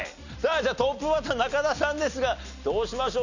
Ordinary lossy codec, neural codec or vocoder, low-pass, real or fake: none; none; 7.2 kHz; real